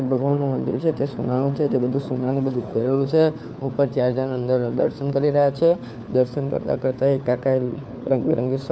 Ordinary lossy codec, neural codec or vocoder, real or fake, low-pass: none; codec, 16 kHz, 4 kbps, FunCodec, trained on LibriTTS, 50 frames a second; fake; none